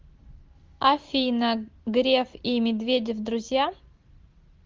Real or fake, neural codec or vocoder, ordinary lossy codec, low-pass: real; none; Opus, 32 kbps; 7.2 kHz